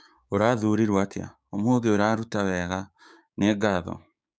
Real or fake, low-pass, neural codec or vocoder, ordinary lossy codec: fake; none; codec, 16 kHz, 6 kbps, DAC; none